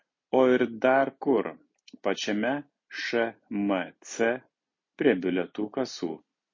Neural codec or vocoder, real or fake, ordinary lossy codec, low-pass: none; real; MP3, 32 kbps; 7.2 kHz